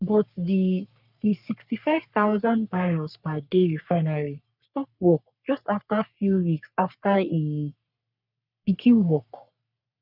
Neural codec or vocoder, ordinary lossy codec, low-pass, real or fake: codec, 44.1 kHz, 3.4 kbps, Pupu-Codec; none; 5.4 kHz; fake